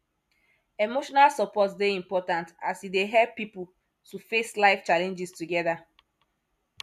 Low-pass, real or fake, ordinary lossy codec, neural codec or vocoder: 14.4 kHz; real; none; none